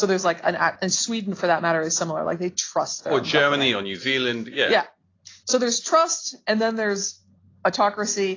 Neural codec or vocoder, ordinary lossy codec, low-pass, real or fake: none; AAC, 32 kbps; 7.2 kHz; real